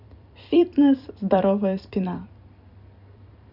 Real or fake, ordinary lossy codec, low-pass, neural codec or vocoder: fake; none; 5.4 kHz; vocoder, 44.1 kHz, 128 mel bands every 256 samples, BigVGAN v2